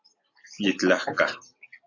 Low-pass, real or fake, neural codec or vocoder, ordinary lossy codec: 7.2 kHz; real; none; AAC, 48 kbps